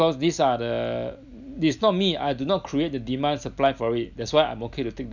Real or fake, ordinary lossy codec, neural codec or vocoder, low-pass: real; none; none; 7.2 kHz